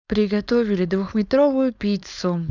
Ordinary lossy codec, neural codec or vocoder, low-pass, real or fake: none; codec, 16 kHz, 4.8 kbps, FACodec; 7.2 kHz; fake